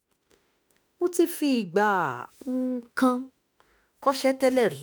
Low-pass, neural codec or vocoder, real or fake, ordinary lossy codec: none; autoencoder, 48 kHz, 32 numbers a frame, DAC-VAE, trained on Japanese speech; fake; none